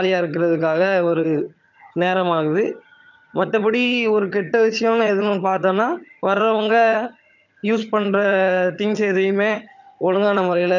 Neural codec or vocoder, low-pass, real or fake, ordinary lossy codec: vocoder, 22.05 kHz, 80 mel bands, HiFi-GAN; 7.2 kHz; fake; none